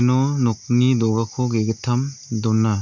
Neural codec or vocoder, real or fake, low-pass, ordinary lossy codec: none; real; 7.2 kHz; none